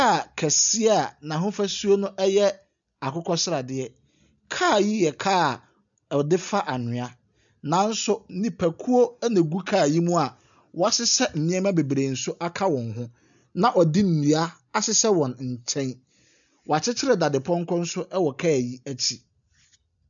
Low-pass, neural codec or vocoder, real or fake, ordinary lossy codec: 7.2 kHz; none; real; MP3, 96 kbps